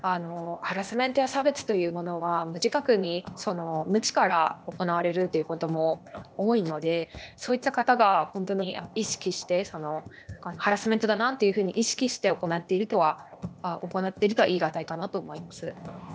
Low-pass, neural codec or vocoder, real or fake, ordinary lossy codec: none; codec, 16 kHz, 0.8 kbps, ZipCodec; fake; none